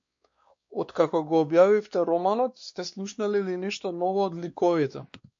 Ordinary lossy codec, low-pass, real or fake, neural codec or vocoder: MP3, 48 kbps; 7.2 kHz; fake; codec, 16 kHz, 2 kbps, X-Codec, WavLM features, trained on Multilingual LibriSpeech